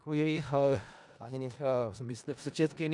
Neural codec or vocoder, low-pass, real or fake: codec, 16 kHz in and 24 kHz out, 0.4 kbps, LongCat-Audio-Codec, four codebook decoder; 10.8 kHz; fake